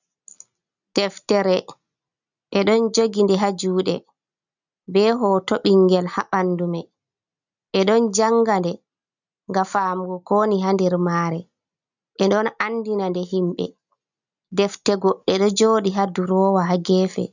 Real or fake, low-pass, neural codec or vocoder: real; 7.2 kHz; none